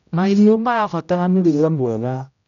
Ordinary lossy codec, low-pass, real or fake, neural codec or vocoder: none; 7.2 kHz; fake; codec, 16 kHz, 0.5 kbps, X-Codec, HuBERT features, trained on general audio